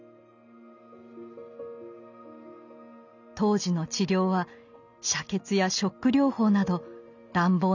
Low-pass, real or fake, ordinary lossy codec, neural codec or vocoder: 7.2 kHz; real; none; none